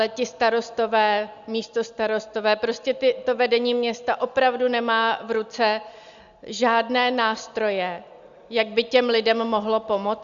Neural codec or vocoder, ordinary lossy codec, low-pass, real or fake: none; Opus, 64 kbps; 7.2 kHz; real